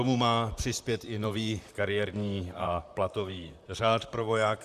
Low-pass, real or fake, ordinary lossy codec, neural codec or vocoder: 14.4 kHz; fake; Opus, 64 kbps; vocoder, 44.1 kHz, 128 mel bands, Pupu-Vocoder